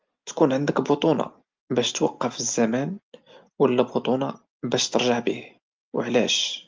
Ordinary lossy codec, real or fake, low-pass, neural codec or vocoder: Opus, 32 kbps; real; 7.2 kHz; none